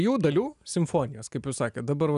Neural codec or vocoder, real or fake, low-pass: none; real; 10.8 kHz